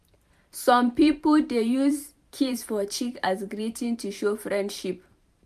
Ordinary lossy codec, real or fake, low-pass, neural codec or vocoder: none; fake; 14.4 kHz; vocoder, 44.1 kHz, 128 mel bands every 512 samples, BigVGAN v2